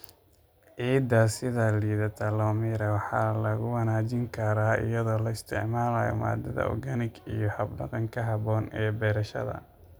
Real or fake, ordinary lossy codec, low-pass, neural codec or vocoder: real; none; none; none